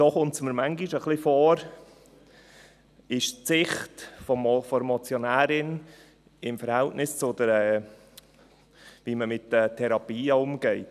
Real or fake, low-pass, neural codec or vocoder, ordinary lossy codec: real; 14.4 kHz; none; none